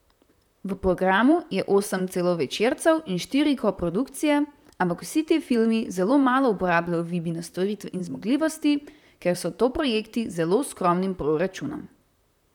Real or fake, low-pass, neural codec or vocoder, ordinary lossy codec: fake; 19.8 kHz; vocoder, 44.1 kHz, 128 mel bands, Pupu-Vocoder; none